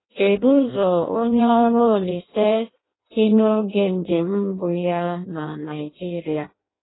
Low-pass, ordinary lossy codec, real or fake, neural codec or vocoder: 7.2 kHz; AAC, 16 kbps; fake; codec, 16 kHz in and 24 kHz out, 0.6 kbps, FireRedTTS-2 codec